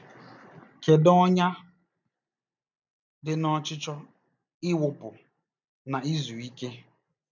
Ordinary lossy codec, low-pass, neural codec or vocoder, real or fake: none; 7.2 kHz; none; real